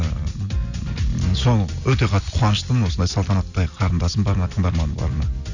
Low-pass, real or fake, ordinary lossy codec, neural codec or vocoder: 7.2 kHz; real; none; none